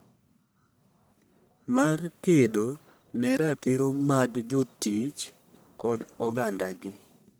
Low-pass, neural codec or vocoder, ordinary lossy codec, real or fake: none; codec, 44.1 kHz, 1.7 kbps, Pupu-Codec; none; fake